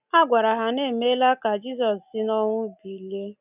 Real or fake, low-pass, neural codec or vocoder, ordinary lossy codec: real; 3.6 kHz; none; none